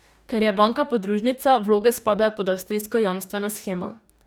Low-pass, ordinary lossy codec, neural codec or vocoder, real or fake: none; none; codec, 44.1 kHz, 2.6 kbps, DAC; fake